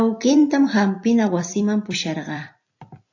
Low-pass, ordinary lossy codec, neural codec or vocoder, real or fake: 7.2 kHz; AAC, 48 kbps; vocoder, 44.1 kHz, 128 mel bands every 256 samples, BigVGAN v2; fake